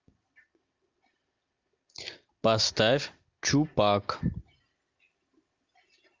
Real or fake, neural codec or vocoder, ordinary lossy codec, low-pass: real; none; Opus, 24 kbps; 7.2 kHz